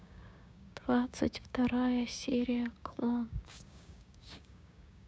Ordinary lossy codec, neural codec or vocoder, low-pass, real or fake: none; codec, 16 kHz, 6 kbps, DAC; none; fake